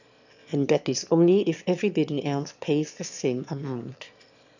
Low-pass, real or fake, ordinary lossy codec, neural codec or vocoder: 7.2 kHz; fake; none; autoencoder, 22.05 kHz, a latent of 192 numbers a frame, VITS, trained on one speaker